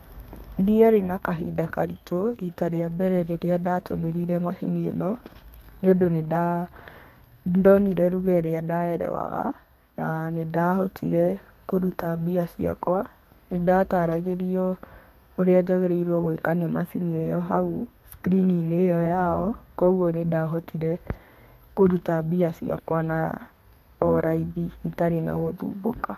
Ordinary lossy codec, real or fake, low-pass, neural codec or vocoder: MP3, 64 kbps; fake; 14.4 kHz; codec, 32 kHz, 1.9 kbps, SNAC